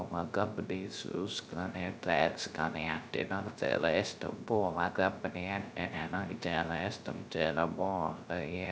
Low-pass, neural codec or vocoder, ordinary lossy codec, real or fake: none; codec, 16 kHz, 0.3 kbps, FocalCodec; none; fake